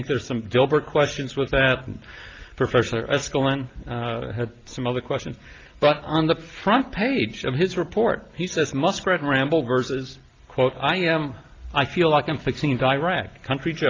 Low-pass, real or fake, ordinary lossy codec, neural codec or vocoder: 7.2 kHz; real; Opus, 32 kbps; none